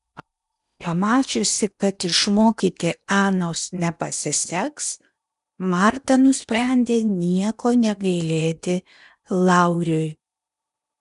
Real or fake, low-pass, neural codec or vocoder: fake; 10.8 kHz; codec, 16 kHz in and 24 kHz out, 0.8 kbps, FocalCodec, streaming, 65536 codes